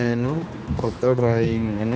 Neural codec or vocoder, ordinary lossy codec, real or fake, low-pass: codec, 16 kHz, 2 kbps, X-Codec, HuBERT features, trained on general audio; none; fake; none